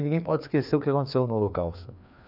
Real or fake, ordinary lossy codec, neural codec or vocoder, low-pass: fake; none; codec, 16 kHz, 2 kbps, FreqCodec, larger model; 5.4 kHz